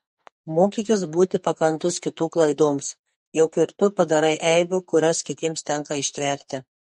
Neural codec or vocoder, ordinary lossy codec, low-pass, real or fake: codec, 44.1 kHz, 2.6 kbps, SNAC; MP3, 48 kbps; 14.4 kHz; fake